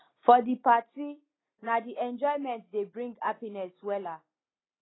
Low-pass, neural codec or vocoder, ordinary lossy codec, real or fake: 7.2 kHz; none; AAC, 16 kbps; real